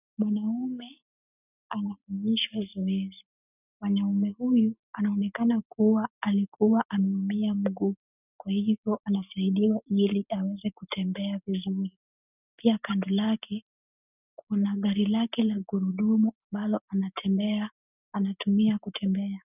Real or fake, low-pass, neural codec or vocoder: real; 3.6 kHz; none